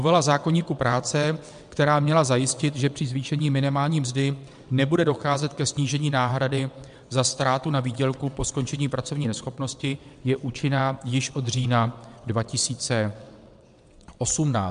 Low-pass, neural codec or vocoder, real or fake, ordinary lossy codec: 9.9 kHz; vocoder, 22.05 kHz, 80 mel bands, WaveNeXt; fake; MP3, 64 kbps